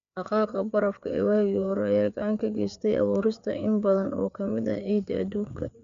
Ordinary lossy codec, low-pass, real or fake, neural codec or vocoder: AAC, 96 kbps; 7.2 kHz; fake; codec, 16 kHz, 4 kbps, FreqCodec, larger model